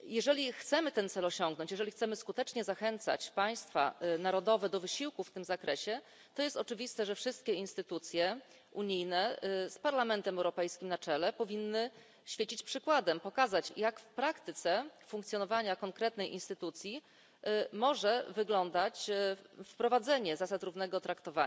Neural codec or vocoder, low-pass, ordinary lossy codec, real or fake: none; none; none; real